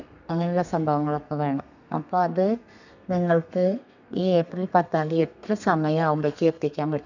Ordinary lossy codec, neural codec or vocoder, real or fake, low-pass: none; codec, 32 kHz, 1.9 kbps, SNAC; fake; 7.2 kHz